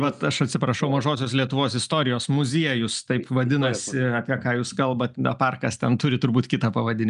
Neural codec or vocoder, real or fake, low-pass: none; real; 10.8 kHz